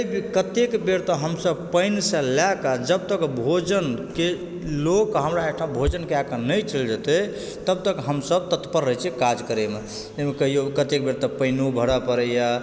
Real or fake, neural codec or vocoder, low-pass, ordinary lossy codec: real; none; none; none